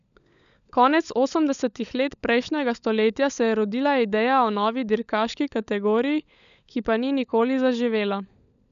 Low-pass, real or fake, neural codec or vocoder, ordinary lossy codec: 7.2 kHz; fake; codec, 16 kHz, 16 kbps, FunCodec, trained on LibriTTS, 50 frames a second; none